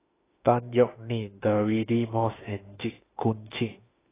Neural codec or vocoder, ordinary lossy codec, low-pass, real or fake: autoencoder, 48 kHz, 32 numbers a frame, DAC-VAE, trained on Japanese speech; AAC, 16 kbps; 3.6 kHz; fake